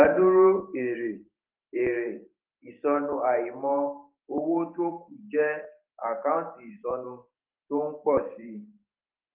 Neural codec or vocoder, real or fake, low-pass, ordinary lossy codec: vocoder, 44.1 kHz, 128 mel bands every 512 samples, BigVGAN v2; fake; 3.6 kHz; Opus, 32 kbps